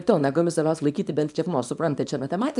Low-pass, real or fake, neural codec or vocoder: 10.8 kHz; fake; codec, 24 kHz, 0.9 kbps, WavTokenizer, small release